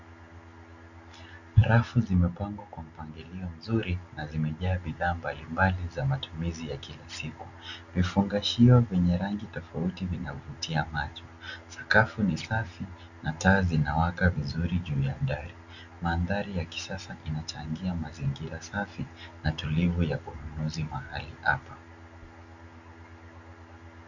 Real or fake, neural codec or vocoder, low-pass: real; none; 7.2 kHz